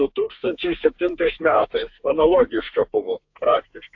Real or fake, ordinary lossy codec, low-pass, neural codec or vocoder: fake; AAC, 48 kbps; 7.2 kHz; codec, 32 kHz, 1.9 kbps, SNAC